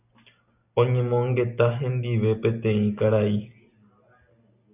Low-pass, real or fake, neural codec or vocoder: 3.6 kHz; real; none